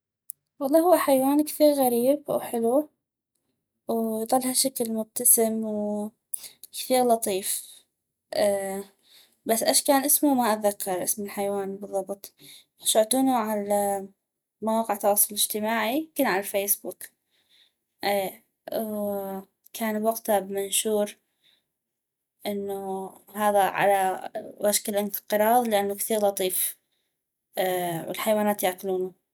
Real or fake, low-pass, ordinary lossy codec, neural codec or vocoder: real; none; none; none